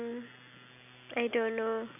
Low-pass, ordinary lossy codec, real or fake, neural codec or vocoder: 3.6 kHz; none; real; none